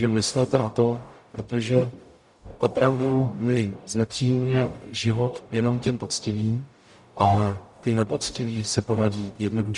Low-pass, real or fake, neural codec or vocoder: 10.8 kHz; fake; codec, 44.1 kHz, 0.9 kbps, DAC